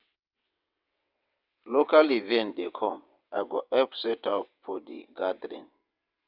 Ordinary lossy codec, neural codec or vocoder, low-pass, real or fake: none; vocoder, 22.05 kHz, 80 mel bands, WaveNeXt; 5.4 kHz; fake